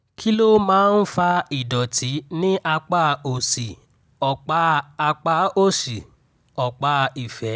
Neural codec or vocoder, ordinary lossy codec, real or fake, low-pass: none; none; real; none